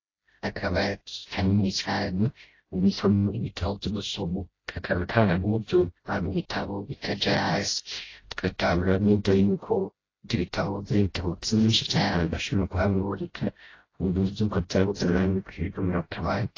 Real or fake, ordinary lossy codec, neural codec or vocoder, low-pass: fake; AAC, 32 kbps; codec, 16 kHz, 0.5 kbps, FreqCodec, smaller model; 7.2 kHz